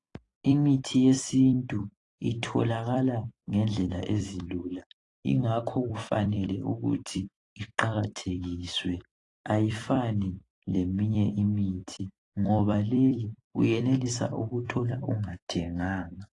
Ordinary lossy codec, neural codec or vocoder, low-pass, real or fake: AAC, 32 kbps; vocoder, 44.1 kHz, 128 mel bands every 256 samples, BigVGAN v2; 10.8 kHz; fake